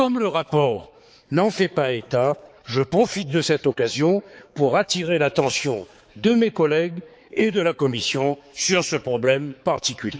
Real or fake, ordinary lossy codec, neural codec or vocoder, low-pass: fake; none; codec, 16 kHz, 4 kbps, X-Codec, HuBERT features, trained on balanced general audio; none